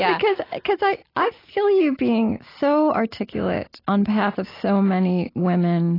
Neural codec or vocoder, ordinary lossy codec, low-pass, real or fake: none; AAC, 24 kbps; 5.4 kHz; real